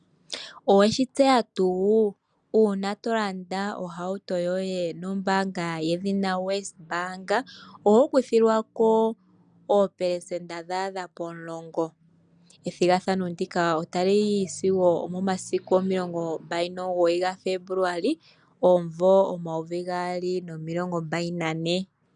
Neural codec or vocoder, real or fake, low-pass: none; real; 9.9 kHz